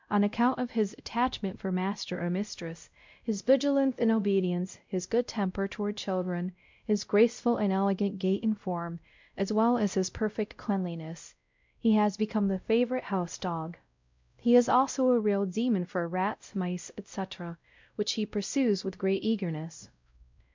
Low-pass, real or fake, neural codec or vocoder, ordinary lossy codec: 7.2 kHz; fake; codec, 16 kHz, 0.5 kbps, X-Codec, WavLM features, trained on Multilingual LibriSpeech; MP3, 64 kbps